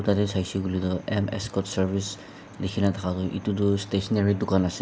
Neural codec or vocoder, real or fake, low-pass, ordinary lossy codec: none; real; none; none